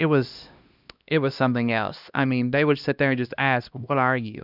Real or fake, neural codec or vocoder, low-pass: fake; codec, 16 kHz, 1 kbps, X-Codec, HuBERT features, trained on LibriSpeech; 5.4 kHz